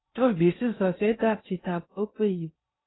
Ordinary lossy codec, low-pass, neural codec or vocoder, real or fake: AAC, 16 kbps; 7.2 kHz; codec, 16 kHz in and 24 kHz out, 0.6 kbps, FocalCodec, streaming, 2048 codes; fake